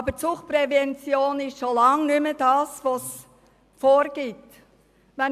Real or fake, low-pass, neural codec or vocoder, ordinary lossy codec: real; 14.4 kHz; none; AAC, 96 kbps